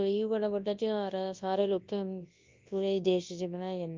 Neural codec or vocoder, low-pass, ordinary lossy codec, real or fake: codec, 24 kHz, 0.9 kbps, WavTokenizer, large speech release; 7.2 kHz; Opus, 24 kbps; fake